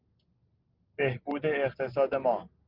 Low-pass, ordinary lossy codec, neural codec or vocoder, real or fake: 5.4 kHz; Opus, 32 kbps; none; real